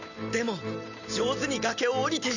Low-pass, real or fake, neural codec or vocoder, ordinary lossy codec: 7.2 kHz; real; none; none